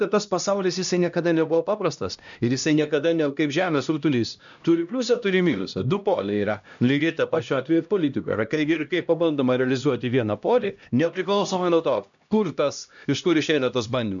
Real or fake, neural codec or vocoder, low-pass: fake; codec, 16 kHz, 1 kbps, X-Codec, HuBERT features, trained on LibriSpeech; 7.2 kHz